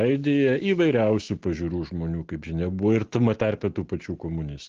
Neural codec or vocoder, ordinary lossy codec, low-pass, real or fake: none; Opus, 16 kbps; 7.2 kHz; real